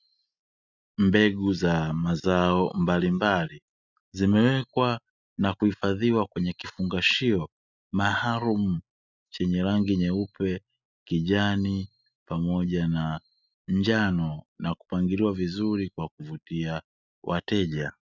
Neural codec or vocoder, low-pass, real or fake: none; 7.2 kHz; real